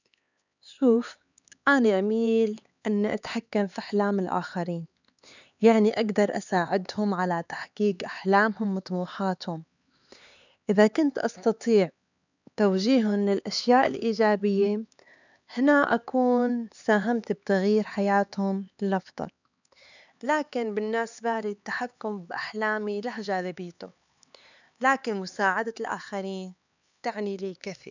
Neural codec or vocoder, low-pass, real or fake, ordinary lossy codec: codec, 16 kHz, 4 kbps, X-Codec, HuBERT features, trained on LibriSpeech; 7.2 kHz; fake; none